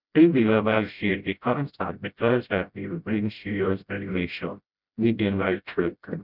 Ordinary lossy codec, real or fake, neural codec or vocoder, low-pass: none; fake; codec, 16 kHz, 0.5 kbps, FreqCodec, smaller model; 5.4 kHz